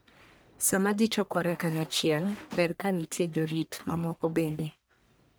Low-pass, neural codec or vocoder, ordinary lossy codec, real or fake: none; codec, 44.1 kHz, 1.7 kbps, Pupu-Codec; none; fake